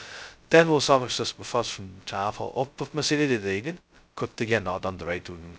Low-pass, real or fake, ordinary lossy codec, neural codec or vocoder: none; fake; none; codec, 16 kHz, 0.2 kbps, FocalCodec